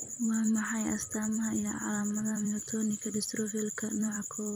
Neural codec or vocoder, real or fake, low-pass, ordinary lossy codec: vocoder, 44.1 kHz, 128 mel bands every 256 samples, BigVGAN v2; fake; none; none